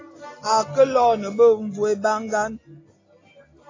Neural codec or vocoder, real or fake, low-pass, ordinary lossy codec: none; real; 7.2 kHz; AAC, 32 kbps